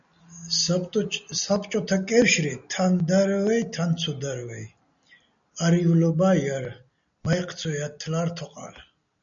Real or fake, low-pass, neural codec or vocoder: real; 7.2 kHz; none